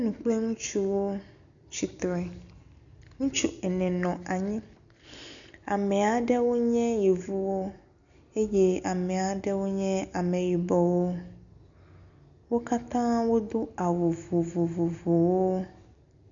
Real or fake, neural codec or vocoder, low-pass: real; none; 7.2 kHz